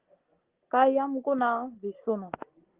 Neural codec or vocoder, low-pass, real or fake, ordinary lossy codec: none; 3.6 kHz; real; Opus, 16 kbps